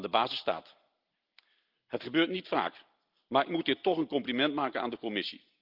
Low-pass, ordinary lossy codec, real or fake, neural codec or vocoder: 5.4 kHz; Opus, 32 kbps; real; none